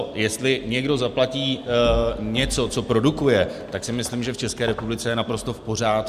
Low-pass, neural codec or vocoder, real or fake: 14.4 kHz; none; real